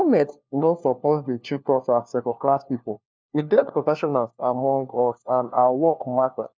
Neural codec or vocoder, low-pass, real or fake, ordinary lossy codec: codec, 16 kHz, 1 kbps, FunCodec, trained on LibriTTS, 50 frames a second; none; fake; none